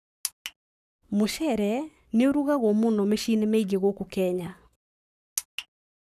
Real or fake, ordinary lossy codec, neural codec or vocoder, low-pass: fake; none; codec, 44.1 kHz, 7.8 kbps, Pupu-Codec; 14.4 kHz